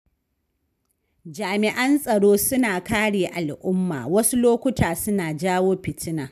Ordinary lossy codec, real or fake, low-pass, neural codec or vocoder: none; real; 14.4 kHz; none